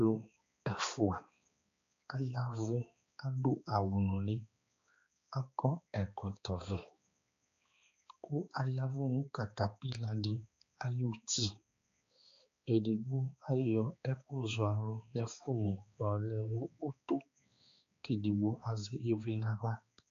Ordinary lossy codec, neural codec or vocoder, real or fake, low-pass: AAC, 48 kbps; codec, 16 kHz, 2 kbps, X-Codec, HuBERT features, trained on balanced general audio; fake; 7.2 kHz